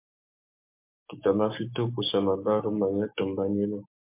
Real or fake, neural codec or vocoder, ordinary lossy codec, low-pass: fake; codec, 16 kHz, 6 kbps, DAC; MP3, 32 kbps; 3.6 kHz